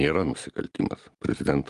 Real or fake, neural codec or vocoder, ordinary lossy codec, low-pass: real; none; Opus, 32 kbps; 10.8 kHz